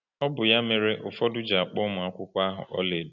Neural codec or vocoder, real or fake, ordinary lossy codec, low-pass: none; real; none; 7.2 kHz